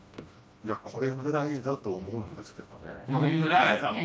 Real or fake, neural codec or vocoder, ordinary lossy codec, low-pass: fake; codec, 16 kHz, 1 kbps, FreqCodec, smaller model; none; none